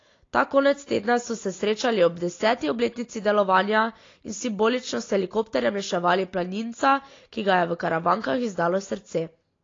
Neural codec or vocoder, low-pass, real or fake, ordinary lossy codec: none; 7.2 kHz; real; AAC, 32 kbps